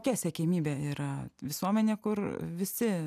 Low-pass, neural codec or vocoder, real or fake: 14.4 kHz; none; real